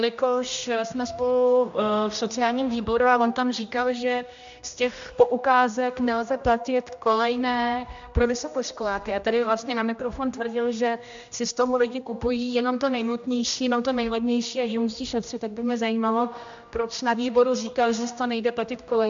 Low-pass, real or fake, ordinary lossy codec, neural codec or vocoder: 7.2 kHz; fake; AAC, 64 kbps; codec, 16 kHz, 1 kbps, X-Codec, HuBERT features, trained on general audio